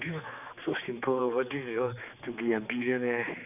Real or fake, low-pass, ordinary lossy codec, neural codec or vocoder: fake; 3.6 kHz; none; codec, 16 kHz, 4 kbps, X-Codec, HuBERT features, trained on general audio